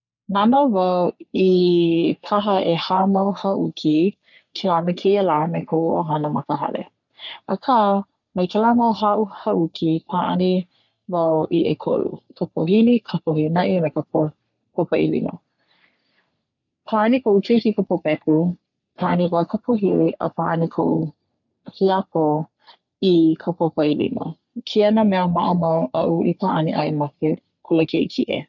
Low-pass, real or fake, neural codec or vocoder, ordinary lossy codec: 7.2 kHz; fake; codec, 44.1 kHz, 3.4 kbps, Pupu-Codec; none